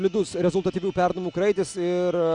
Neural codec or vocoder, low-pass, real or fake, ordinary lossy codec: none; 9.9 kHz; real; Opus, 64 kbps